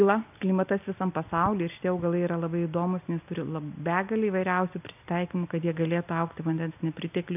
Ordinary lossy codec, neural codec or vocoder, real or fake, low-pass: AAC, 32 kbps; none; real; 3.6 kHz